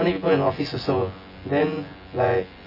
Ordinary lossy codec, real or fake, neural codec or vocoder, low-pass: none; fake; vocoder, 24 kHz, 100 mel bands, Vocos; 5.4 kHz